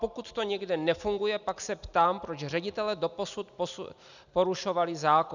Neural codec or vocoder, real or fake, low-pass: none; real; 7.2 kHz